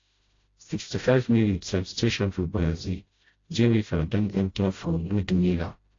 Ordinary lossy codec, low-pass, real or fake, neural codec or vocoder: AAC, 32 kbps; 7.2 kHz; fake; codec, 16 kHz, 0.5 kbps, FreqCodec, smaller model